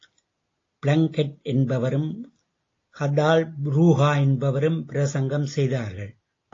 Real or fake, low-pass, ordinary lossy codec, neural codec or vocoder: real; 7.2 kHz; AAC, 32 kbps; none